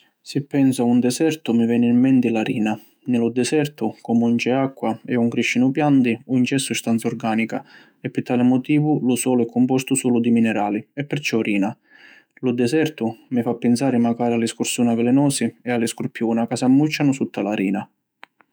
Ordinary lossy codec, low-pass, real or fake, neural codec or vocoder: none; none; fake; autoencoder, 48 kHz, 128 numbers a frame, DAC-VAE, trained on Japanese speech